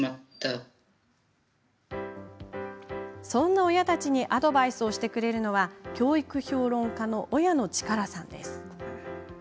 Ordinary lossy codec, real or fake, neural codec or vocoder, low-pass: none; real; none; none